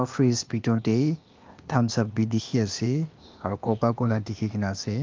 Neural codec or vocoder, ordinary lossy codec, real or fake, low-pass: codec, 16 kHz, 0.8 kbps, ZipCodec; Opus, 24 kbps; fake; 7.2 kHz